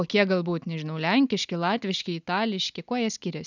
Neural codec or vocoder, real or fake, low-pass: none; real; 7.2 kHz